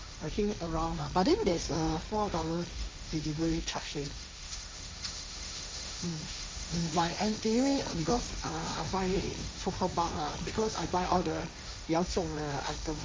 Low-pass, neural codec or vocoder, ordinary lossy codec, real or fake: none; codec, 16 kHz, 1.1 kbps, Voila-Tokenizer; none; fake